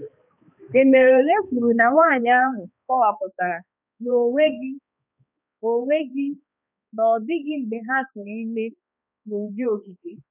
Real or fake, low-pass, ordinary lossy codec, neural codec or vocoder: fake; 3.6 kHz; none; codec, 16 kHz, 2 kbps, X-Codec, HuBERT features, trained on balanced general audio